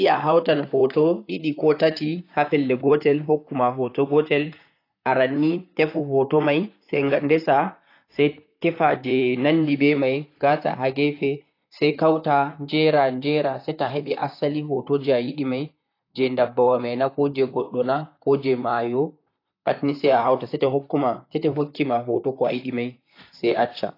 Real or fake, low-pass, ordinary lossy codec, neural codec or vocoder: fake; 5.4 kHz; AAC, 32 kbps; vocoder, 44.1 kHz, 128 mel bands, Pupu-Vocoder